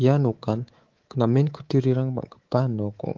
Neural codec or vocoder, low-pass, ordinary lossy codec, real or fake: codec, 24 kHz, 3.1 kbps, DualCodec; 7.2 kHz; Opus, 16 kbps; fake